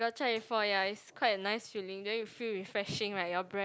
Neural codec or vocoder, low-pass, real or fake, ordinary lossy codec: none; none; real; none